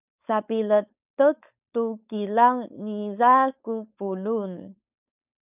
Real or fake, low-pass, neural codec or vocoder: fake; 3.6 kHz; codec, 16 kHz, 4.8 kbps, FACodec